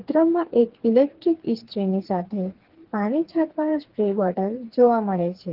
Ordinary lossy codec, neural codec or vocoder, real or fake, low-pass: Opus, 16 kbps; codec, 16 kHz, 4 kbps, FreqCodec, smaller model; fake; 5.4 kHz